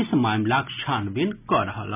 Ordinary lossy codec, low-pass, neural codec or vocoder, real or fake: none; 3.6 kHz; none; real